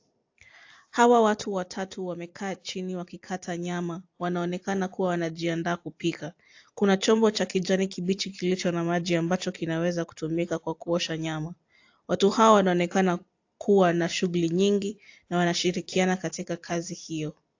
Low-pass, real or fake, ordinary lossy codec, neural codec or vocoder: 7.2 kHz; fake; AAC, 48 kbps; vocoder, 44.1 kHz, 128 mel bands every 256 samples, BigVGAN v2